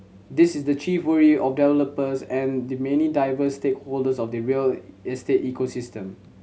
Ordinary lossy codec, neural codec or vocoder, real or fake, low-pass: none; none; real; none